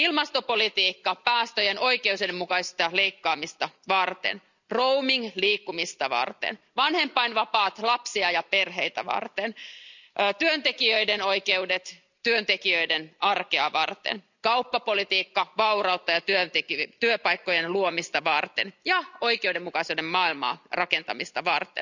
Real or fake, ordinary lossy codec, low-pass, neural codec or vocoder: real; none; 7.2 kHz; none